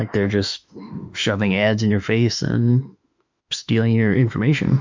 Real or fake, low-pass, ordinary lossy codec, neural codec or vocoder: fake; 7.2 kHz; MP3, 64 kbps; autoencoder, 48 kHz, 32 numbers a frame, DAC-VAE, trained on Japanese speech